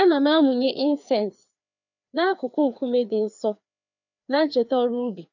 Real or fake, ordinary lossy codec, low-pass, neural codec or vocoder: fake; none; 7.2 kHz; codec, 16 kHz, 2 kbps, FreqCodec, larger model